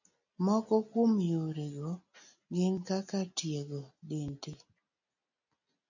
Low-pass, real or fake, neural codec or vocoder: 7.2 kHz; real; none